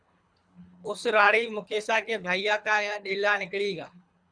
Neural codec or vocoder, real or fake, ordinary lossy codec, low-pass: codec, 24 kHz, 3 kbps, HILCodec; fake; Opus, 64 kbps; 9.9 kHz